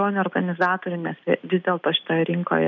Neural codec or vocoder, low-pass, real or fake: none; 7.2 kHz; real